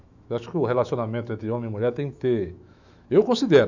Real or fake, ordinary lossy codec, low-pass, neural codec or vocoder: fake; none; 7.2 kHz; autoencoder, 48 kHz, 128 numbers a frame, DAC-VAE, trained on Japanese speech